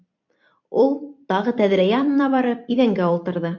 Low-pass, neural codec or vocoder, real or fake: 7.2 kHz; vocoder, 44.1 kHz, 128 mel bands every 256 samples, BigVGAN v2; fake